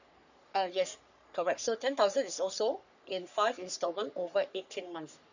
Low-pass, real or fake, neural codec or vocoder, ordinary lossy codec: 7.2 kHz; fake; codec, 44.1 kHz, 3.4 kbps, Pupu-Codec; none